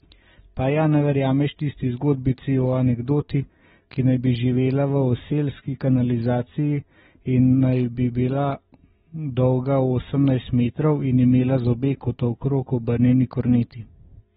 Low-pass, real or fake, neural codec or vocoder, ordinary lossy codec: 10.8 kHz; real; none; AAC, 16 kbps